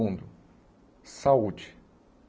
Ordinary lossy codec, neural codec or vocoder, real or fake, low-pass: none; none; real; none